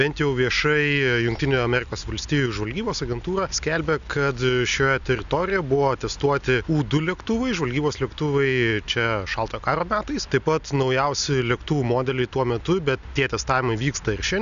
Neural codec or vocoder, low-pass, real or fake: none; 7.2 kHz; real